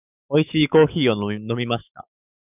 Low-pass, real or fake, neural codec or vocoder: 3.6 kHz; fake; autoencoder, 48 kHz, 128 numbers a frame, DAC-VAE, trained on Japanese speech